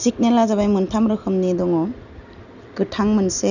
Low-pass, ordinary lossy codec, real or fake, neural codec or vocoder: 7.2 kHz; none; real; none